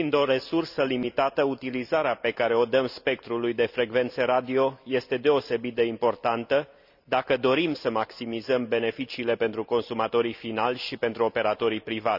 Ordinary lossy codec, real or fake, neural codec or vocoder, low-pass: none; real; none; 5.4 kHz